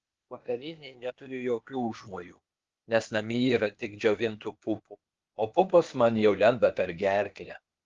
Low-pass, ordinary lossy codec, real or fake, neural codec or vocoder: 7.2 kHz; Opus, 32 kbps; fake; codec, 16 kHz, 0.8 kbps, ZipCodec